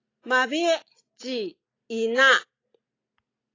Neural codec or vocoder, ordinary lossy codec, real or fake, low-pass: none; AAC, 32 kbps; real; 7.2 kHz